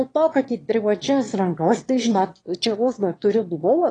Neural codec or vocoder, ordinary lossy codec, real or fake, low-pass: autoencoder, 22.05 kHz, a latent of 192 numbers a frame, VITS, trained on one speaker; AAC, 32 kbps; fake; 9.9 kHz